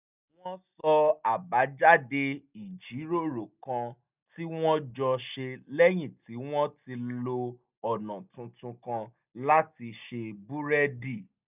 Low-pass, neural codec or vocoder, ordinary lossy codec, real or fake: 3.6 kHz; none; none; real